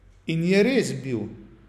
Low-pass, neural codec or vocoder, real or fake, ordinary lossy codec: 14.4 kHz; none; real; none